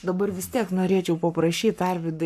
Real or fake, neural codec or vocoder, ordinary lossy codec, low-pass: fake; codec, 44.1 kHz, 7.8 kbps, Pupu-Codec; AAC, 96 kbps; 14.4 kHz